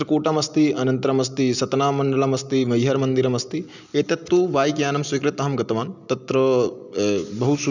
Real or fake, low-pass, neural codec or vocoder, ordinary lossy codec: real; 7.2 kHz; none; none